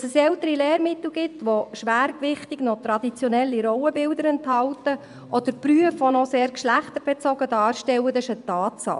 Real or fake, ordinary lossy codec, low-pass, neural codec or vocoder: fake; none; 10.8 kHz; vocoder, 24 kHz, 100 mel bands, Vocos